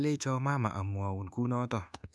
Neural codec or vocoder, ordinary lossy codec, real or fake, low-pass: codec, 24 kHz, 1.2 kbps, DualCodec; none; fake; none